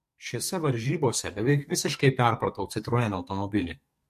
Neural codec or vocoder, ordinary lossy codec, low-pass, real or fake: codec, 44.1 kHz, 2.6 kbps, SNAC; MP3, 64 kbps; 14.4 kHz; fake